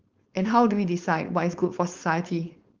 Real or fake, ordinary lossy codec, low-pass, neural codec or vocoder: fake; Opus, 32 kbps; 7.2 kHz; codec, 16 kHz, 4.8 kbps, FACodec